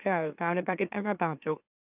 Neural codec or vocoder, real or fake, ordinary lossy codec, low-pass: autoencoder, 44.1 kHz, a latent of 192 numbers a frame, MeloTTS; fake; none; 3.6 kHz